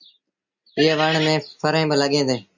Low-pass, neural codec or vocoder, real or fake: 7.2 kHz; none; real